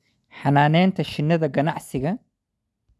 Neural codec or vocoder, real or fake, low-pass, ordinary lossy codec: none; real; none; none